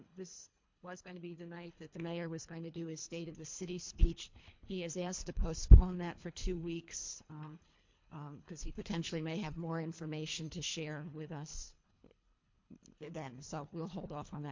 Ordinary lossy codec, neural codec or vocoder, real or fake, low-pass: MP3, 48 kbps; codec, 24 kHz, 3 kbps, HILCodec; fake; 7.2 kHz